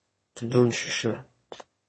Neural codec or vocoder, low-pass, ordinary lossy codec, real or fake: autoencoder, 22.05 kHz, a latent of 192 numbers a frame, VITS, trained on one speaker; 9.9 kHz; MP3, 32 kbps; fake